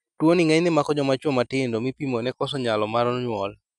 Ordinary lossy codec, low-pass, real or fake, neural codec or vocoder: none; 10.8 kHz; real; none